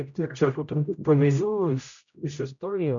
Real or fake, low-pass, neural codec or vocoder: fake; 7.2 kHz; codec, 16 kHz, 0.5 kbps, X-Codec, HuBERT features, trained on general audio